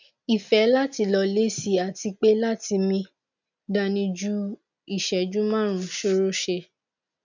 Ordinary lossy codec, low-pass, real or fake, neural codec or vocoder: none; 7.2 kHz; real; none